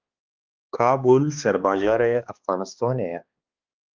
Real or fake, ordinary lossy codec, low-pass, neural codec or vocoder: fake; Opus, 24 kbps; 7.2 kHz; codec, 16 kHz, 2 kbps, X-Codec, HuBERT features, trained on balanced general audio